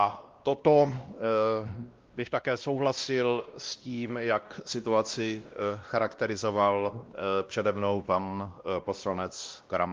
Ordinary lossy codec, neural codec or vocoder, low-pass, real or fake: Opus, 32 kbps; codec, 16 kHz, 1 kbps, X-Codec, WavLM features, trained on Multilingual LibriSpeech; 7.2 kHz; fake